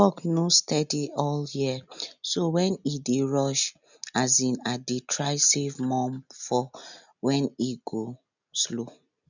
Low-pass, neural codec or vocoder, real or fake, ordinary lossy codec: 7.2 kHz; none; real; none